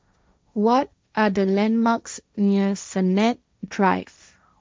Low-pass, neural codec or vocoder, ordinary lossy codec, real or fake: none; codec, 16 kHz, 1.1 kbps, Voila-Tokenizer; none; fake